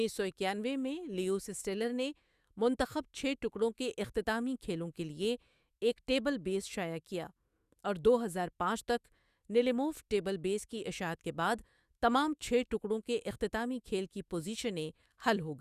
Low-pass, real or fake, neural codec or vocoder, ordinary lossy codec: 14.4 kHz; fake; autoencoder, 48 kHz, 128 numbers a frame, DAC-VAE, trained on Japanese speech; Opus, 64 kbps